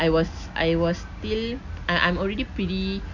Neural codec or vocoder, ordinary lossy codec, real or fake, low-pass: none; none; real; 7.2 kHz